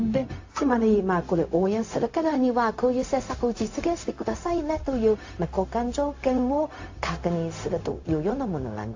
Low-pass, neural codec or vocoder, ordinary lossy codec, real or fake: 7.2 kHz; codec, 16 kHz, 0.4 kbps, LongCat-Audio-Codec; AAC, 48 kbps; fake